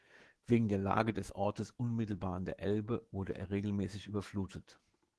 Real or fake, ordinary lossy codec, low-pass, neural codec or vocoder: fake; Opus, 16 kbps; 10.8 kHz; autoencoder, 48 kHz, 128 numbers a frame, DAC-VAE, trained on Japanese speech